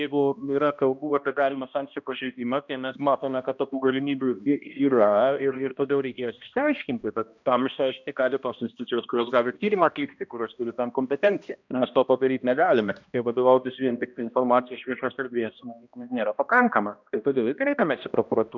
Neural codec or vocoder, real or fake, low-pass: codec, 16 kHz, 1 kbps, X-Codec, HuBERT features, trained on balanced general audio; fake; 7.2 kHz